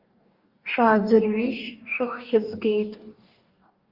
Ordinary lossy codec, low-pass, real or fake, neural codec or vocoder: Opus, 32 kbps; 5.4 kHz; fake; codec, 44.1 kHz, 2.6 kbps, DAC